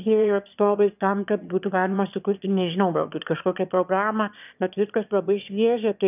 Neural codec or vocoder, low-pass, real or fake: autoencoder, 22.05 kHz, a latent of 192 numbers a frame, VITS, trained on one speaker; 3.6 kHz; fake